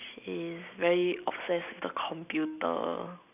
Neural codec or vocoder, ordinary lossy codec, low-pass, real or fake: none; none; 3.6 kHz; real